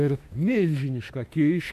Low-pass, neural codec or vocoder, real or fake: 14.4 kHz; autoencoder, 48 kHz, 32 numbers a frame, DAC-VAE, trained on Japanese speech; fake